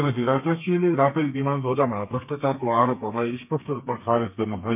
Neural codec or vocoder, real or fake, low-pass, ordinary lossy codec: codec, 32 kHz, 1.9 kbps, SNAC; fake; 3.6 kHz; none